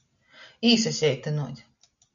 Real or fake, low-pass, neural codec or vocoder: real; 7.2 kHz; none